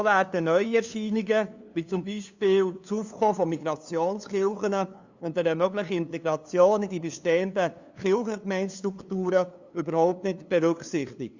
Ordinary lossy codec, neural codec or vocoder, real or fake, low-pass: Opus, 64 kbps; codec, 16 kHz, 2 kbps, FunCodec, trained on LibriTTS, 25 frames a second; fake; 7.2 kHz